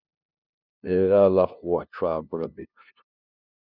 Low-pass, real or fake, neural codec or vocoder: 5.4 kHz; fake; codec, 16 kHz, 0.5 kbps, FunCodec, trained on LibriTTS, 25 frames a second